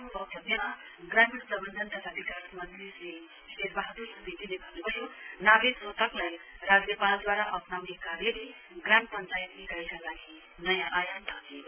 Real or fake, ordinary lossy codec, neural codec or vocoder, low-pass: real; MP3, 32 kbps; none; 3.6 kHz